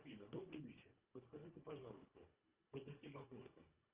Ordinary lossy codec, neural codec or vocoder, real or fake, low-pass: Opus, 24 kbps; codec, 24 kHz, 1.5 kbps, HILCodec; fake; 3.6 kHz